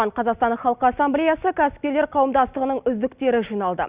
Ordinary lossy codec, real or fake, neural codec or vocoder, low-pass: Opus, 64 kbps; real; none; 3.6 kHz